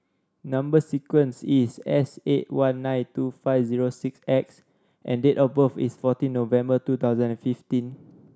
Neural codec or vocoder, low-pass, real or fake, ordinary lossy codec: none; none; real; none